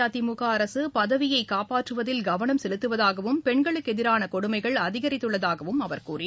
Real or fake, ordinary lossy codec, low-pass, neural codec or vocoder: real; none; none; none